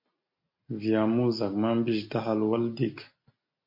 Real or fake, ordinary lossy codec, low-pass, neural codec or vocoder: real; MP3, 32 kbps; 5.4 kHz; none